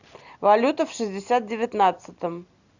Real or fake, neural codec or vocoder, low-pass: real; none; 7.2 kHz